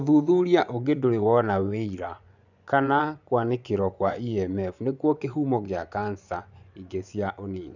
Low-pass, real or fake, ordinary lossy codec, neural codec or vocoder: 7.2 kHz; fake; none; vocoder, 24 kHz, 100 mel bands, Vocos